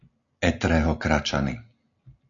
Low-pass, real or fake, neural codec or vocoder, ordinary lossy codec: 7.2 kHz; real; none; AAC, 48 kbps